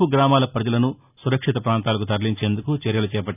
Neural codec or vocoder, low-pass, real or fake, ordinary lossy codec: none; 3.6 kHz; real; none